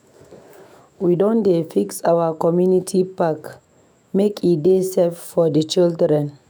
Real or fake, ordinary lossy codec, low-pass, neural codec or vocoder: fake; none; none; autoencoder, 48 kHz, 128 numbers a frame, DAC-VAE, trained on Japanese speech